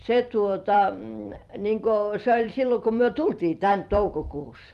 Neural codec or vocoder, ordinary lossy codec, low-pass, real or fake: vocoder, 44.1 kHz, 128 mel bands every 256 samples, BigVGAN v2; Opus, 32 kbps; 14.4 kHz; fake